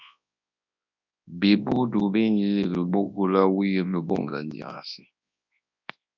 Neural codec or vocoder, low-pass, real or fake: codec, 24 kHz, 0.9 kbps, WavTokenizer, large speech release; 7.2 kHz; fake